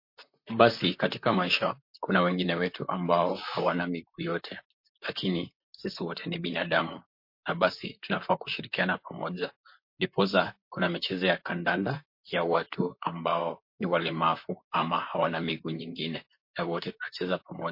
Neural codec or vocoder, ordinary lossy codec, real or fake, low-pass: vocoder, 44.1 kHz, 128 mel bands, Pupu-Vocoder; MP3, 32 kbps; fake; 5.4 kHz